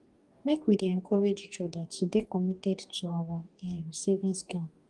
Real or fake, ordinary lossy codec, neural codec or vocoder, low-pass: fake; Opus, 24 kbps; codec, 44.1 kHz, 2.6 kbps, DAC; 10.8 kHz